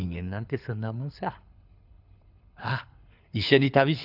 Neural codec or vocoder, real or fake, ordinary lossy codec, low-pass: codec, 24 kHz, 6 kbps, HILCodec; fake; none; 5.4 kHz